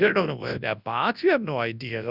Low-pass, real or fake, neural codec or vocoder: 5.4 kHz; fake; codec, 24 kHz, 0.9 kbps, WavTokenizer, large speech release